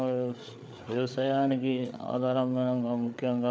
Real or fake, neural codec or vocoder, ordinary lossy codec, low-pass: fake; codec, 16 kHz, 8 kbps, FreqCodec, larger model; none; none